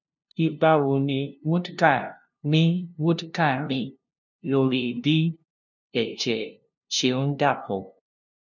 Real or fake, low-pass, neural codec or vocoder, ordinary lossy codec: fake; 7.2 kHz; codec, 16 kHz, 0.5 kbps, FunCodec, trained on LibriTTS, 25 frames a second; none